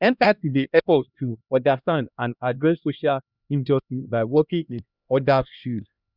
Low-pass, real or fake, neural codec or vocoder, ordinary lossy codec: 5.4 kHz; fake; codec, 16 kHz, 1 kbps, X-Codec, HuBERT features, trained on LibriSpeech; Opus, 64 kbps